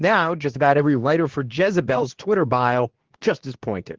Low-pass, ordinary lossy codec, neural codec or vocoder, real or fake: 7.2 kHz; Opus, 16 kbps; codec, 24 kHz, 0.9 kbps, WavTokenizer, medium speech release version 2; fake